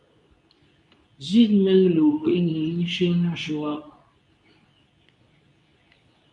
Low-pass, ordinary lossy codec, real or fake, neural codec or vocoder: 10.8 kHz; MP3, 96 kbps; fake; codec, 24 kHz, 0.9 kbps, WavTokenizer, medium speech release version 2